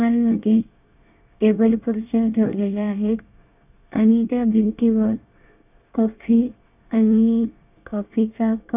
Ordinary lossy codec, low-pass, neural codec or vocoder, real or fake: none; 3.6 kHz; codec, 24 kHz, 1 kbps, SNAC; fake